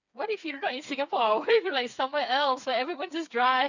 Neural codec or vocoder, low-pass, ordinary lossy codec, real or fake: codec, 16 kHz, 4 kbps, FreqCodec, smaller model; 7.2 kHz; none; fake